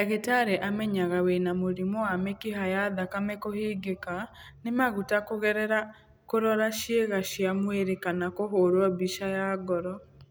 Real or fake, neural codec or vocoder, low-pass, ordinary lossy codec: real; none; none; none